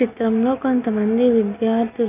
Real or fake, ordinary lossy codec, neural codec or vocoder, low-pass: real; none; none; 3.6 kHz